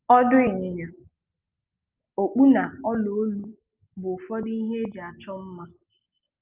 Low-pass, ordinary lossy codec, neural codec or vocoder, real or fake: 3.6 kHz; Opus, 24 kbps; none; real